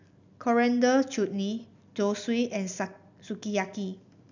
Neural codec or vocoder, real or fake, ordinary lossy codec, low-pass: none; real; none; 7.2 kHz